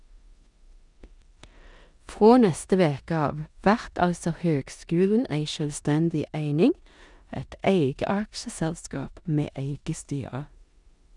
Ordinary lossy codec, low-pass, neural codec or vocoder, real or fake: none; 10.8 kHz; codec, 16 kHz in and 24 kHz out, 0.9 kbps, LongCat-Audio-Codec, four codebook decoder; fake